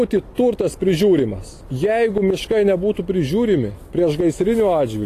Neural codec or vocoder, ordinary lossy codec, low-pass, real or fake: none; AAC, 48 kbps; 14.4 kHz; real